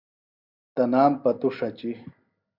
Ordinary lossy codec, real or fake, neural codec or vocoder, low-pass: Opus, 64 kbps; real; none; 5.4 kHz